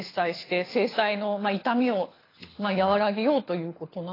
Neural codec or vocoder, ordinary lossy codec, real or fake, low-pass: codec, 24 kHz, 6 kbps, HILCodec; AAC, 24 kbps; fake; 5.4 kHz